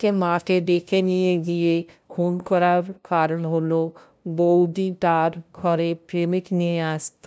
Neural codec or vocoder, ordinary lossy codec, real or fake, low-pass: codec, 16 kHz, 0.5 kbps, FunCodec, trained on LibriTTS, 25 frames a second; none; fake; none